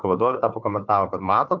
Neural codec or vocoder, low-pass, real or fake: codec, 16 kHz, 4 kbps, FunCodec, trained on Chinese and English, 50 frames a second; 7.2 kHz; fake